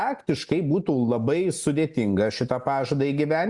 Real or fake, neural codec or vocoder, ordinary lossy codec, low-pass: real; none; Opus, 64 kbps; 10.8 kHz